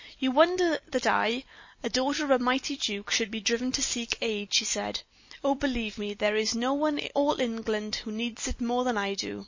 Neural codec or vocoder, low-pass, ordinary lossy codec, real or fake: none; 7.2 kHz; MP3, 32 kbps; real